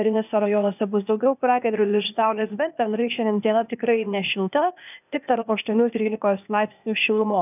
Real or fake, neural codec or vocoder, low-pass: fake; codec, 16 kHz, 0.8 kbps, ZipCodec; 3.6 kHz